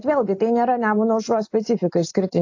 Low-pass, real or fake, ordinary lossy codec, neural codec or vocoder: 7.2 kHz; real; AAC, 48 kbps; none